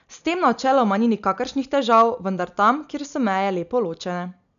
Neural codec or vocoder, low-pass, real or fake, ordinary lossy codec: none; 7.2 kHz; real; none